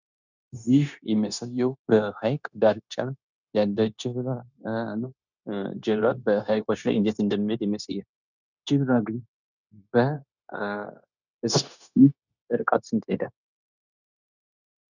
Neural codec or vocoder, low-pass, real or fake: codec, 16 kHz, 0.9 kbps, LongCat-Audio-Codec; 7.2 kHz; fake